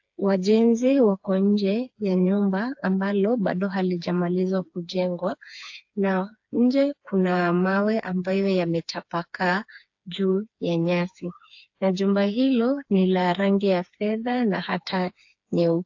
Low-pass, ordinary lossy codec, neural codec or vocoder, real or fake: 7.2 kHz; AAC, 48 kbps; codec, 16 kHz, 4 kbps, FreqCodec, smaller model; fake